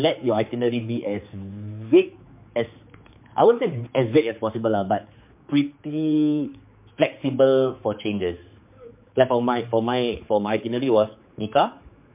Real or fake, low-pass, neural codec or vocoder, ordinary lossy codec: fake; 3.6 kHz; codec, 16 kHz, 4 kbps, X-Codec, HuBERT features, trained on general audio; MP3, 32 kbps